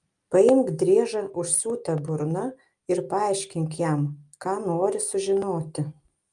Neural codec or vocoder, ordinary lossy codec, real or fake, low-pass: none; Opus, 32 kbps; real; 10.8 kHz